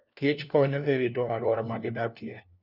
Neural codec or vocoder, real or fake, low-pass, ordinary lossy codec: codec, 16 kHz, 1 kbps, FunCodec, trained on LibriTTS, 50 frames a second; fake; 5.4 kHz; none